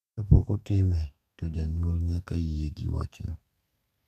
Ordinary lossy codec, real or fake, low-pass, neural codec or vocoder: none; fake; 14.4 kHz; codec, 32 kHz, 1.9 kbps, SNAC